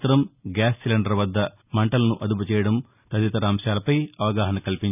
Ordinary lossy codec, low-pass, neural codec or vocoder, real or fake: none; 3.6 kHz; none; real